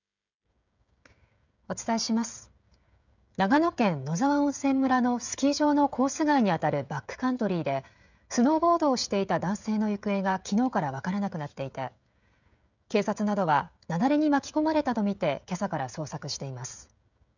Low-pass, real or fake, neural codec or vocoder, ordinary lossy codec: 7.2 kHz; fake; codec, 16 kHz, 16 kbps, FreqCodec, smaller model; none